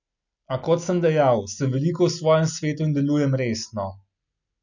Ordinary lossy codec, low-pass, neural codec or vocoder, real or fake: none; 7.2 kHz; none; real